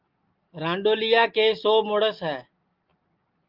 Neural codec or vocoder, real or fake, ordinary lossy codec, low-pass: none; real; Opus, 32 kbps; 5.4 kHz